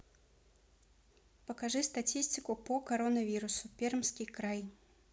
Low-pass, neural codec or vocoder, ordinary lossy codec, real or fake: none; none; none; real